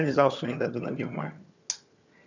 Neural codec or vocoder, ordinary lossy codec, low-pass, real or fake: vocoder, 22.05 kHz, 80 mel bands, HiFi-GAN; none; 7.2 kHz; fake